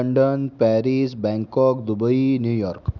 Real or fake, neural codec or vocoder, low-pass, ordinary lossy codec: real; none; 7.2 kHz; none